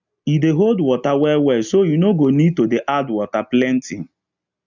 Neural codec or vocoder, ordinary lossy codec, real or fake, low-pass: none; none; real; 7.2 kHz